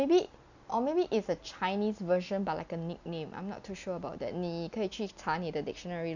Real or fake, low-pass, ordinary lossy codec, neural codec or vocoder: real; 7.2 kHz; none; none